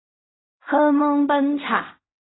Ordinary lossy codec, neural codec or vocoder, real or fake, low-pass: AAC, 16 kbps; codec, 16 kHz in and 24 kHz out, 0.4 kbps, LongCat-Audio-Codec, fine tuned four codebook decoder; fake; 7.2 kHz